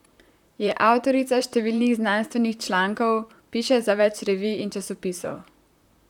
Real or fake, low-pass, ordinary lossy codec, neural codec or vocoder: fake; 19.8 kHz; none; vocoder, 44.1 kHz, 128 mel bands, Pupu-Vocoder